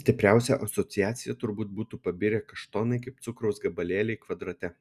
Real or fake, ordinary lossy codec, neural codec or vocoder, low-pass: real; Opus, 64 kbps; none; 14.4 kHz